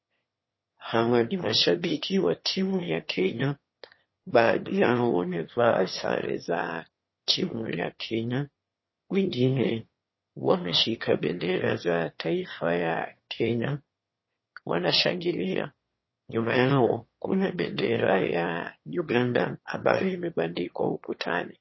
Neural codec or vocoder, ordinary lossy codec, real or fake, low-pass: autoencoder, 22.05 kHz, a latent of 192 numbers a frame, VITS, trained on one speaker; MP3, 24 kbps; fake; 7.2 kHz